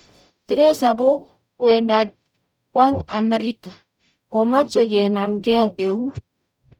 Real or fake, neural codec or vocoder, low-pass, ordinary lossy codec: fake; codec, 44.1 kHz, 0.9 kbps, DAC; 19.8 kHz; none